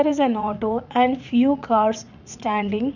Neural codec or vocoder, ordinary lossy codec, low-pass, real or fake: vocoder, 44.1 kHz, 80 mel bands, Vocos; none; 7.2 kHz; fake